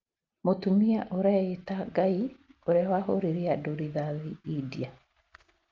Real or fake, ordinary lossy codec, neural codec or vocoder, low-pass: real; Opus, 24 kbps; none; 7.2 kHz